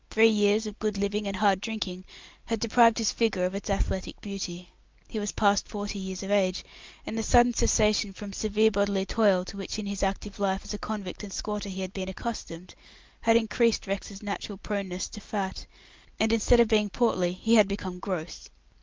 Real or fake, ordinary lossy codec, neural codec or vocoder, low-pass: real; Opus, 32 kbps; none; 7.2 kHz